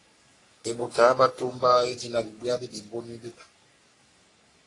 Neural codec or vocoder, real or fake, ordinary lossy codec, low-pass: codec, 44.1 kHz, 3.4 kbps, Pupu-Codec; fake; AAC, 32 kbps; 10.8 kHz